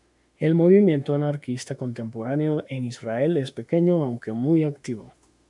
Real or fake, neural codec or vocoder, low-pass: fake; autoencoder, 48 kHz, 32 numbers a frame, DAC-VAE, trained on Japanese speech; 10.8 kHz